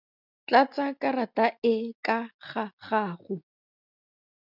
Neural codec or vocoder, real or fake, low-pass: none; real; 5.4 kHz